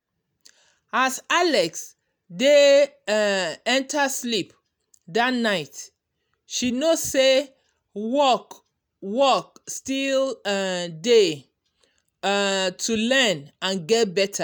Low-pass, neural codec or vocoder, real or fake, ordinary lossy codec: none; none; real; none